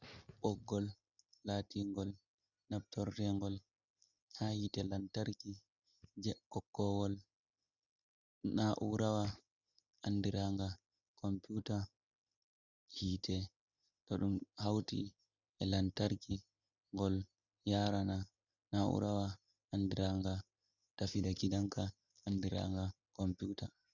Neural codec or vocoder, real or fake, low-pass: none; real; 7.2 kHz